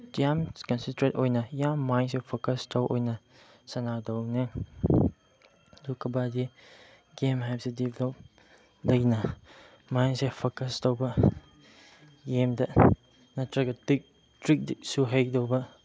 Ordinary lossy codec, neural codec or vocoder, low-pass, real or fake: none; none; none; real